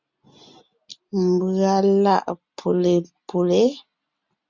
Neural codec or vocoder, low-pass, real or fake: none; 7.2 kHz; real